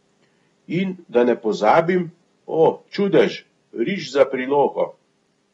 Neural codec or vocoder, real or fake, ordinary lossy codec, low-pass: none; real; AAC, 32 kbps; 10.8 kHz